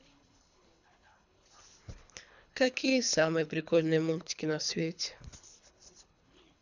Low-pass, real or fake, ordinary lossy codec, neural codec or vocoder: 7.2 kHz; fake; none; codec, 24 kHz, 3 kbps, HILCodec